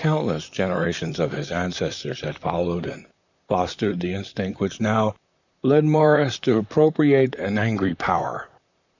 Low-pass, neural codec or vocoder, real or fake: 7.2 kHz; vocoder, 44.1 kHz, 128 mel bands, Pupu-Vocoder; fake